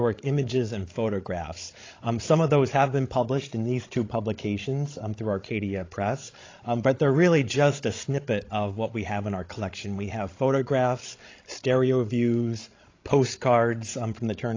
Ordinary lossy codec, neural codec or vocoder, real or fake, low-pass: AAC, 32 kbps; codec, 16 kHz, 16 kbps, FreqCodec, larger model; fake; 7.2 kHz